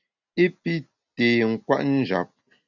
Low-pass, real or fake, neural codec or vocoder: 7.2 kHz; real; none